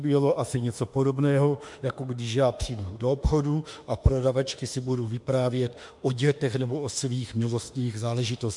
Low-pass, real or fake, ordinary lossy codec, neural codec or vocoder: 10.8 kHz; fake; MP3, 64 kbps; autoencoder, 48 kHz, 32 numbers a frame, DAC-VAE, trained on Japanese speech